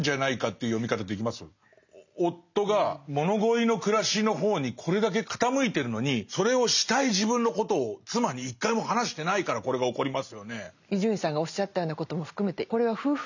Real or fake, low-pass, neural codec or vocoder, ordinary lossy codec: real; 7.2 kHz; none; none